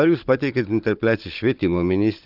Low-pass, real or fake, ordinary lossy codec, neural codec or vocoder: 5.4 kHz; fake; Opus, 32 kbps; codec, 16 kHz, 16 kbps, FunCodec, trained on Chinese and English, 50 frames a second